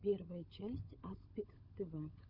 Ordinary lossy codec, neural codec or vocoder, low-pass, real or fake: Opus, 64 kbps; codec, 16 kHz, 16 kbps, FunCodec, trained on LibriTTS, 50 frames a second; 5.4 kHz; fake